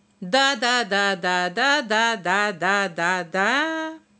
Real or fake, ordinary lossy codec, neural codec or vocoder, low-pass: real; none; none; none